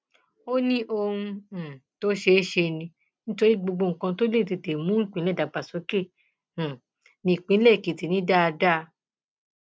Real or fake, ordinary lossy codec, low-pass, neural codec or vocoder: real; none; none; none